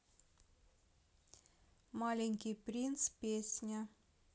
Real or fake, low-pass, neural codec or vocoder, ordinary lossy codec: real; none; none; none